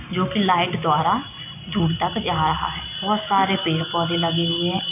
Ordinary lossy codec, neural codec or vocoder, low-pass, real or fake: none; none; 3.6 kHz; real